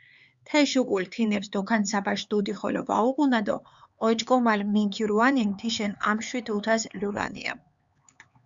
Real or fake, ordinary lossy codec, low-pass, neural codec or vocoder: fake; Opus, 64 kbps; 7.2 kHz; codec, 16 kHz, 4 kbps, X-Codec, HuBERT features, trained on LibriSpeech